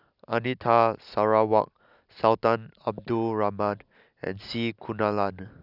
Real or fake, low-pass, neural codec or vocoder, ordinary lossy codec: real; 5.4 kHz; none; none